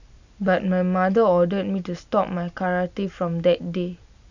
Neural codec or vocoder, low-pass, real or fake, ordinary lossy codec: none; 7.2 kHz; real; none